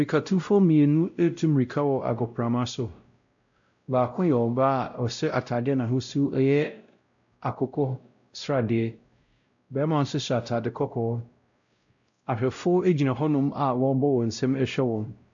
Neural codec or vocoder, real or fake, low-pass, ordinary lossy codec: codec, 16 kHz, 0.5 kbps, X-Codec, WavLM features, trained on Multilingual LibriSpeech; fake; 7.2 kHz; AAC, 64 kbps